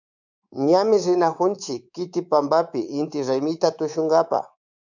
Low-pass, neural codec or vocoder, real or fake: 7.2 kHz; codec, 24 kHz, 3.1 kbps, DualCodec; fake